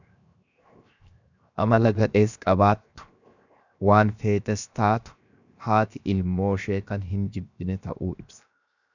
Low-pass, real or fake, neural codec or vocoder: 7.2 kHz; fake; codec, 16 kHz, 0.7 kbps, FocalCodec